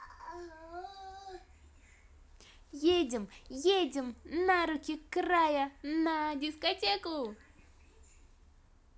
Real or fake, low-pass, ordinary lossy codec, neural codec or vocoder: real; none; none; none